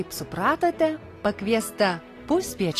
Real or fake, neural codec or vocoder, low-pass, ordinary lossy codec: real; none; 14.4 kHz; AAC, 48 kbps